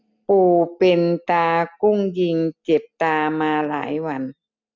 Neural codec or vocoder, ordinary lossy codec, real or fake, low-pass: none; none; real; 7.2 kHz